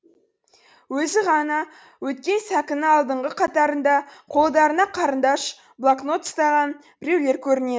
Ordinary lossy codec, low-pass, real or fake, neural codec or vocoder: none; none; real; none